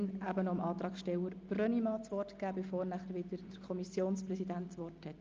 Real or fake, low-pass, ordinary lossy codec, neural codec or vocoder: real; 7.2 kHz; Opus, 24 kbps; none